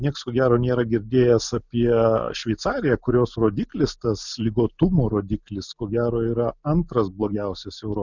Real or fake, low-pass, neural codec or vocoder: real; 7.2 kHz; none